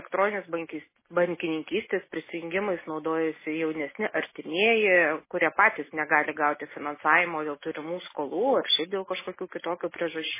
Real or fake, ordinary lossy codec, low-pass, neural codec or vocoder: real; MP3, 16 kbps; 3.6 kHz; none